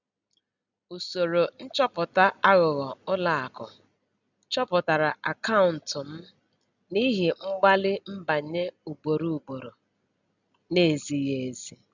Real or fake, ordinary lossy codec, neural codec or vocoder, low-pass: real; none; none; 7.2 kHz